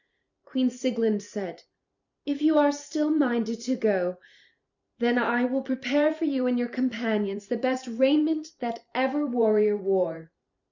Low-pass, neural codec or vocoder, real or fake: 7.2 kHz; vocoder, 44.1 kHz, 128 mel bands every 512 samples, BigVGAN v2; fake